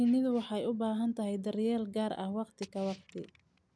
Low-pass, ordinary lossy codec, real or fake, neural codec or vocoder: none; none; real; none